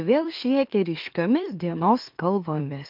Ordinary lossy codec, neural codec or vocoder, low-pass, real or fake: Opus, 32 kbps; autoencoder, 44.1 kHz, a latent of 192 numbers a frame, MeloTTS; 5.4 kHz; fake